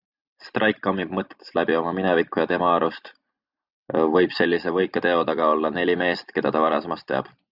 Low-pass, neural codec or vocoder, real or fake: 5.4 kHz; none; real